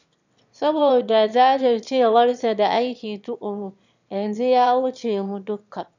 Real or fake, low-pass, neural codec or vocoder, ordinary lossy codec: fake; 7.2 kHz; autoencoder, 22.05 kHz, a latent of 192 numbers a frame, VITS, trained on one speaker; none